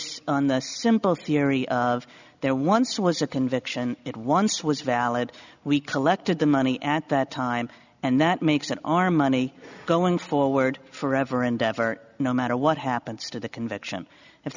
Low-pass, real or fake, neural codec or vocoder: 7.2 kHz; real; none